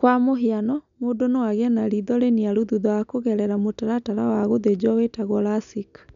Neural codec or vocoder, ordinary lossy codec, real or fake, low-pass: none; none; real; 7.2 kHz